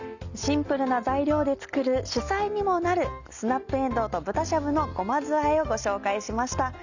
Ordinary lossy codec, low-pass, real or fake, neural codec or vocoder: none; 7.2 kHz; real; none